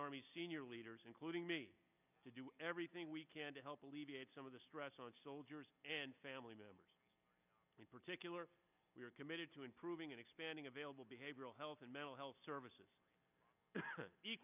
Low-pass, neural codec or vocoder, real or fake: 3.6 kHz; none; real